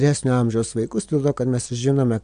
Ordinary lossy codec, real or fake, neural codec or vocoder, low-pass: Opus, 64 kbps; real; none; 9.9 kHz